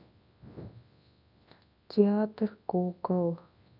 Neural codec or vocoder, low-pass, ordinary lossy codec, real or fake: codec, 24 kHz, 0.9 kbps, WavTokenizer, large speech release; 5.4 kHz; none; fake